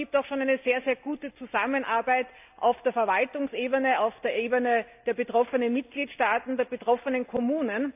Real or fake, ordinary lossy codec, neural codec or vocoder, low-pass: real; AAC, 32 kbps; none; 3.6 kHz